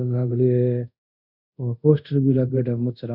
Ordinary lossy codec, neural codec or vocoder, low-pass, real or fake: none; codec, 24 kHz, 0.5 kbps, DualCodec; 5.4 kHz; fake